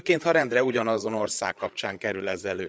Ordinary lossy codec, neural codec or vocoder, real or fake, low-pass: none; codec, 16 kHz, 8 kbps, FreqCodec, larger model; fake; none